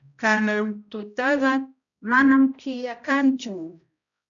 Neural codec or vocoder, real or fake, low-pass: codec, 16 kHz, 0.5 kbps, X-Codec, HuBERT features, trained on balanced general audio; fake; 7.2 kHz